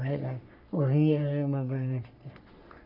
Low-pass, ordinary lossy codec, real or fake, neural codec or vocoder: 5.4 kHz; MP3, 48 kbps; fake; codec, 44.1 kHz, 3.4 kbps, Pupu-Codec